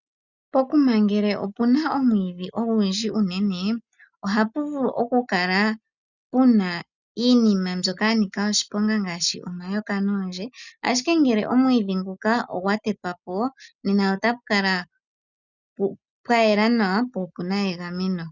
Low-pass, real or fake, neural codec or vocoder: 7.2 kHz; real; none